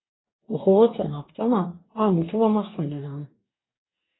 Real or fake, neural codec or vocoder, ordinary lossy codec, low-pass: fake; codec, 44.1 kHz, 2.6 kbps, DAC; AAC, 16 kbps; 7.2 kHz